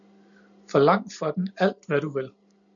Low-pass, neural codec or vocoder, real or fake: 7.2 kHz; none; real